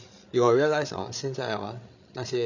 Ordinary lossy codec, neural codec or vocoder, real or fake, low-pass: none; codec, 16 kHz, 16 kbps, FreqCodec, larger model; fake; 7.2 kHz